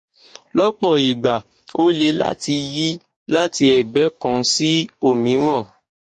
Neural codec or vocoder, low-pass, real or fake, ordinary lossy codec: codec, 44.1 kHz, 2.6 kbps, DAC; 10.8 kHz; fake; MP3, 48 kbps